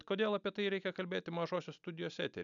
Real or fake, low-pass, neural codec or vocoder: real; 7.2 kHz; none